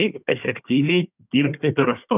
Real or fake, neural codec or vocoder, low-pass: fake; codec, 24 kHz, 1 kbps, SNAC; 3.6 kHz